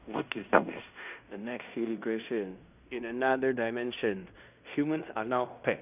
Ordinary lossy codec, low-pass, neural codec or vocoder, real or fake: none; 3.6 kHz; codec, 16 kHz in and 24 kHz out, 0.9 kbps, LongCat-Audio-Codec, fine tuned four codebook decoder; fake